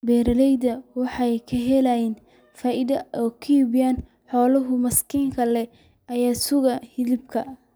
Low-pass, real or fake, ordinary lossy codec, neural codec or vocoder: none; real; none; none